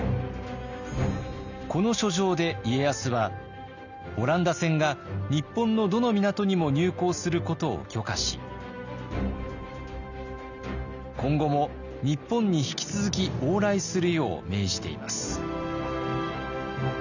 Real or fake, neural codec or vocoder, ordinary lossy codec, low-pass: real; none; none; 7.2 kHz